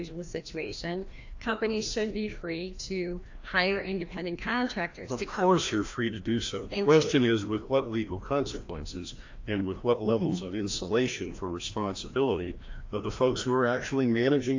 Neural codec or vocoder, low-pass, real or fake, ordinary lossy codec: codec, 16 kHz, 1 kbps, FreqCodec, larger model; 7.2 kHz; fake; AAC, 48 kbps